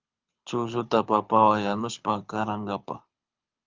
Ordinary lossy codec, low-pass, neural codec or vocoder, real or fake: Opus, 24 kbps; 7.2 kHz; codec, 24 kHz, 6 kbps, HILCodec; fake